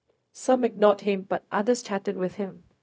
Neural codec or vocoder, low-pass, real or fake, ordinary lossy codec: codec, 16 kHz, 0.4 kbps, LongCat-Audio-Codec; none; fake; none